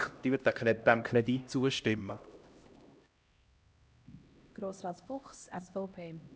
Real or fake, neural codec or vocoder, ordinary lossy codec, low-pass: fake; codec, 16 kHz, 1 kbps, X-Codec, HuBERT features, trained on LibriSpeech; none; none